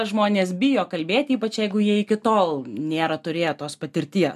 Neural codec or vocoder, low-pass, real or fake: none; 14.4 kHz; real